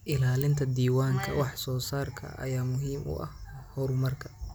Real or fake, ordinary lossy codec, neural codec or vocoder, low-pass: real; none; none; none